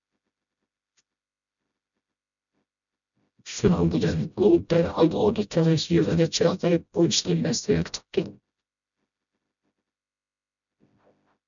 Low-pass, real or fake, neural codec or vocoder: 7.2 kHz; fake; codec, 16 kHz, 0.5 kbps, FreqCodec, smaller model